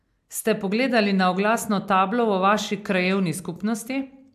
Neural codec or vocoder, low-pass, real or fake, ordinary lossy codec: none; 14.4 kHz; real; AAC, 96 kbps